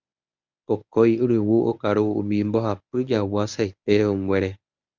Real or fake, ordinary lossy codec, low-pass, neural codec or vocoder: fake; Opus, 64 kbps; 7.2 kHz; codec, 24 kHz, 0.9 kbps, WavTokenizer, medium speech release version 1